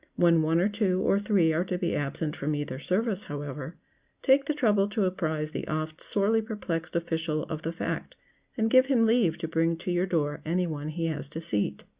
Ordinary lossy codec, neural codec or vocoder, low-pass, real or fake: Opus, 64 kbps; none; 3.6 kHz; real